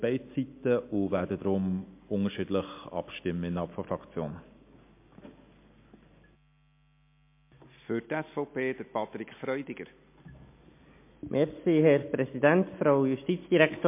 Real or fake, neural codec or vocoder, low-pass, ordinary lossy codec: real; none; 3.6 kHz; MP3, 24 kbps